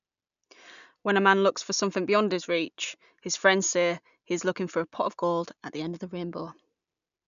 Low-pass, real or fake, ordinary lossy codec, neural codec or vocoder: 7.2 kHz; real; none; none